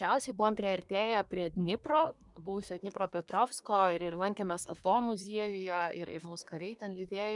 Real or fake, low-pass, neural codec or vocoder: fake; 10.8 kHz; codec, 24 kHz, 1 kbps, SNAC